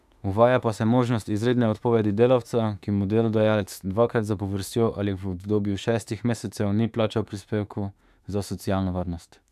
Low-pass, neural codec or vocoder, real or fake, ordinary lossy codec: 14.4 kHz; autoencoder, 48 kHz, 32 numbers a frame, DAC-VAE, trained on Japanese speech; fake; none